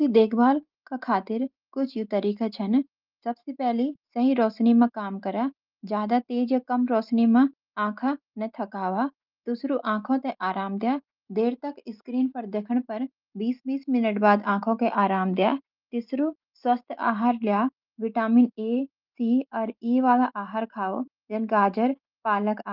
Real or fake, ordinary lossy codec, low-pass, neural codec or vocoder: real; Opus, 32 kbps; 5.4 kHz; none